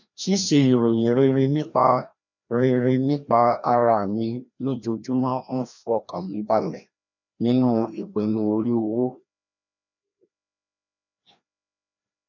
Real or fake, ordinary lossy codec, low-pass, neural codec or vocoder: fake; none; 7.2 kHz; codec, 16 kHz, 1 kbps, FreqCodec, larger model